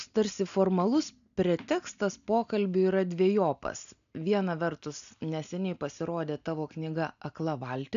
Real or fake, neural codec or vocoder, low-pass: real; none; 7.2 kHz